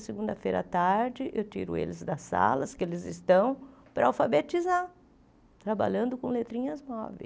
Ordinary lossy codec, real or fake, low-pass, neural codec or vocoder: none; real; none; none